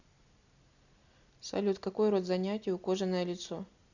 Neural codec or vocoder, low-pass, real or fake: none; 7.2 kHz; real